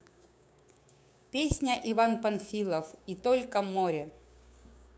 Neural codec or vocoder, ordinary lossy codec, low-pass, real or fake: codec, 16 kHz, 6 kbps, DAC; none; none; fake